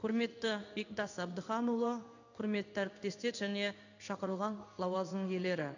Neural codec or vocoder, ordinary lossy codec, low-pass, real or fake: codec, 16 kHz in and 24 kHz out, 1 kbps, XY-Tokenizer; none; 7.2 kHz; fake